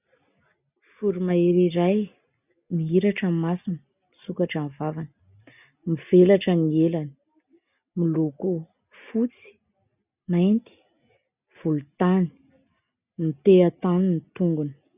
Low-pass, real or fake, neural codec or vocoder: 3.6 kHz; real; none